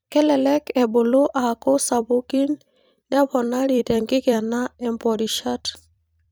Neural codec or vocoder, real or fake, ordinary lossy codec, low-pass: vocoder, 44.1 kHz, 128 mel bands every 256 samples, BigVGAN v2; fake; none; none